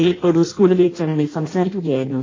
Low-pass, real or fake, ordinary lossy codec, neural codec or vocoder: 7.2 kHz; fake; AAC, 32 kbps; codec, 16 kHz in and 24 kHz out, 0.6 kbps, FireRedTTS-2 codec